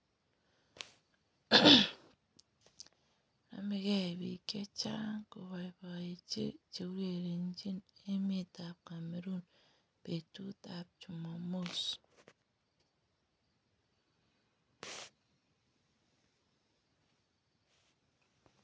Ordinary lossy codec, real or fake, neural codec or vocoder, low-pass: none; real; none; none